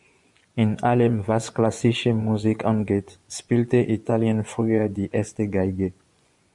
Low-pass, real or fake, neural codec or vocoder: 10.8 kHz; fake; vocoder, 24 kHz, 100 mel bands, Vocos